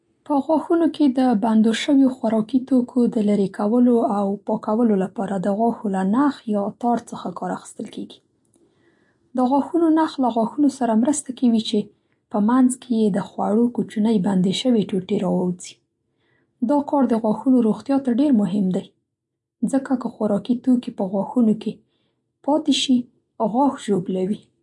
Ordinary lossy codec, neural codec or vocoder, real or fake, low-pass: MP3, 48 kbps; none; real; 10.8 kHz